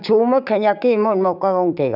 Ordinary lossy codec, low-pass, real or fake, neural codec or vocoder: none; 5.4 kHz; fake; codec, 16 kHz, 6 kbps, DAC